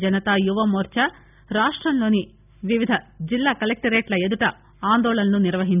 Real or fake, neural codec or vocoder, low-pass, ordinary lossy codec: real; none; 3.6 kHz; none